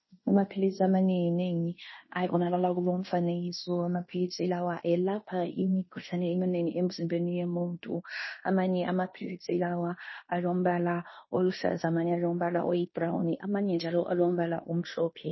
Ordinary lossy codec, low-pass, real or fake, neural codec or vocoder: MP3, 24 kbps; 7.2 kHz; fake; codec, 16 kHz in and 24 kHz out, 0.9 kbps, LongCat-Audio-Codec, fine tuned four codebook decoder